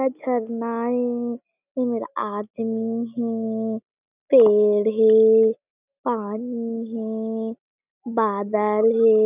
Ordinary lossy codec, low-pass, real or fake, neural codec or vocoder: none; 3.6 kHz; real; none